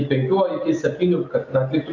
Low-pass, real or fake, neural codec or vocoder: 7.2 kHz; real; none